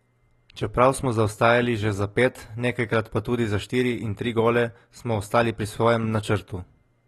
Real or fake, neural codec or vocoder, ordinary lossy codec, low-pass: real; none; AAC, 32 kbps; 19.8 kHz